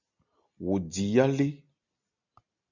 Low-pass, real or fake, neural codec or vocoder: 7.2 kHz; real; none